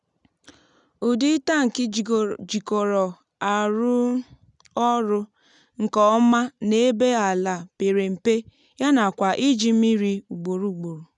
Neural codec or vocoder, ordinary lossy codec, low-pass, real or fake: none; none; 10.8 kHz; real